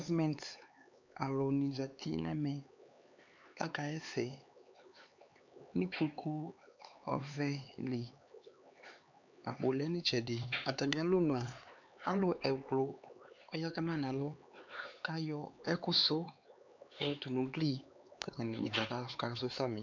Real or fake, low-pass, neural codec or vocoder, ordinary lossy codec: fake; 7.2 kHz; codec, 16 kHz, 4 kbps, X-Codec, HuBERT features, trained on LibriSpeech; MP3, 64 kbps